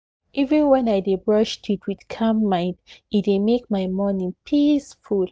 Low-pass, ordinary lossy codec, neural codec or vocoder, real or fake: none; none; none; real